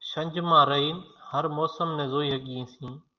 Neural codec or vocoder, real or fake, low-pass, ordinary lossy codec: none; real; 7.2 kHz; Opus, 24 kbps